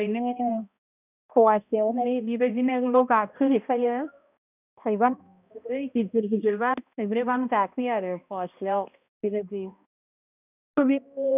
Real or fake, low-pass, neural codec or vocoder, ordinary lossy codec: fake; 3.6 kHz; codec, 16 kHz, 1 kbps, X-Codec, HuBERT features, trained on balanced general audio; none